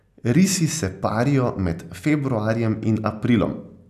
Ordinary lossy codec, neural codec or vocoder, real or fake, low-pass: none; none; real; 14.4 kHz